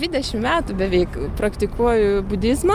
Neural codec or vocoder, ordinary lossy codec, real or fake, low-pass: none; Opus, 32 kbps; real; 14.4 kHz